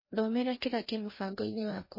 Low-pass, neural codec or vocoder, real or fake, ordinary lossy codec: 5.4 kHz; codec, 16 kHz, 1 kbps, FreqCodec, larger model; fake; MP3, 24 kbps